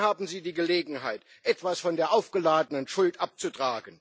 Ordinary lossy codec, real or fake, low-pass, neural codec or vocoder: none; real; none; none